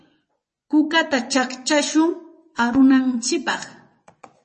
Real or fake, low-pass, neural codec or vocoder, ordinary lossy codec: fake; 10.8 kHz; vocoder, 24 kHz, 100 mel bands, Vocos; MP3, 32 kbps